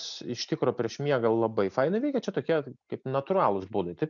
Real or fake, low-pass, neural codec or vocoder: real; 7.2 kHz; none